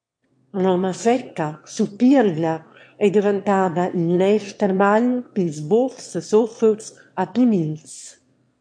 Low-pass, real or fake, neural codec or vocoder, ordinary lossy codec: 9.9 kHz; fake; autoencoder, 22.05 kHz, a latent of 192 numbers a frame, VITS, trained on one speaker; MP3, 48 kbps